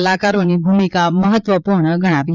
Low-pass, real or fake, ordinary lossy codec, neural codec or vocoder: 7.2 kHz; fake; none; vocoder, 22.05 kHz, 80 mel bands, Vocos